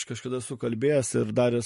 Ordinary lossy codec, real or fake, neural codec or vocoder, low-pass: MP3, 48 kbps; real; none; 14.4 kHz